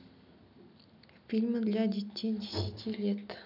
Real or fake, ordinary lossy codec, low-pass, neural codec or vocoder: real; none; 5.4 kHz; none